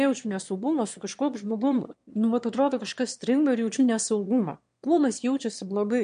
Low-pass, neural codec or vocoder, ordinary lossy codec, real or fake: 9.9 kHz; autoencoder, 22.05 kHz, a latent of 192 numbers a frame, VITS, trained on one speaker; MP3, 64 kbps; fake